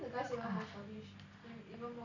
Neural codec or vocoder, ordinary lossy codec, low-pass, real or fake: none; none; 7.2 kHz; real